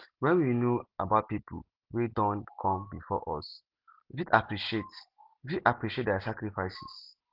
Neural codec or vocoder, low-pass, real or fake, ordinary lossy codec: none; 5.4 kHz; real; Opus, 16 kbps